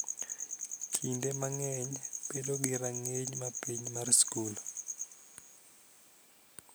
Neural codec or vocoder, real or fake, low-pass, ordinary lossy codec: none; real; none; none